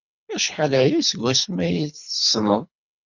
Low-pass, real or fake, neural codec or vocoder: 7.2 kHz; fake; codec, 24 kHz, 3 kbps, HILCodec